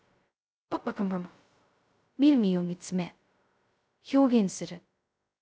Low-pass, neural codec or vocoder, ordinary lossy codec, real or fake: none; codec, 16 kHz, 0.2 kbps, FocalCodec; none; fake